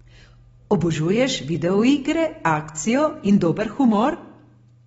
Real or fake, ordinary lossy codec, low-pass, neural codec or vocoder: real; AAC, 24 kbps; 10.8 kHz; none